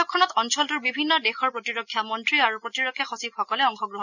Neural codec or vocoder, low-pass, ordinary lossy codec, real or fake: none; 7.2 kHz; none; real